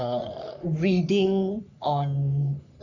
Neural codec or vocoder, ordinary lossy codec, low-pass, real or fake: codec, 44.1 kHz, 3.4 kbps, Pupu-Codec; none; 7.2 kHz; fake